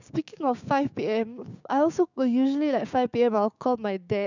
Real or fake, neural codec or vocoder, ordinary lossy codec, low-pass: fake; codec, 16 kHz, 6 kbps, DAC; none; 7.2 kHz